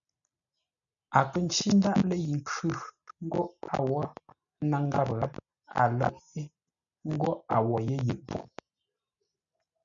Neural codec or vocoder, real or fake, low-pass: none; real; 7.2 kHz